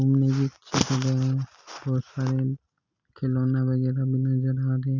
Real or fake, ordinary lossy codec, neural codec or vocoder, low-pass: real; none; none; 7.2 kHz